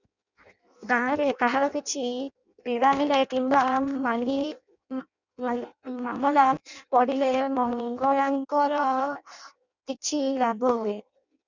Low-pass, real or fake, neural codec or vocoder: 7.2 kHz; fake; codec, 16 kHz in and 24 kHz out, 0.6 kbps, FireRedTTS-2 codec